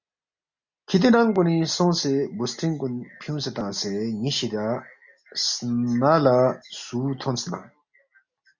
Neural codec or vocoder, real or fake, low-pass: none; real; 7.2 kHz